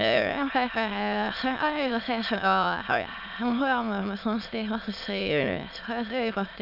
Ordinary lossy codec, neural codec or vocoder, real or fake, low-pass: none; autoencoder, 22.05 kHz, a latent of 192 numbers a frame, VITS, trained on many speakers; fake; 5.4 kHz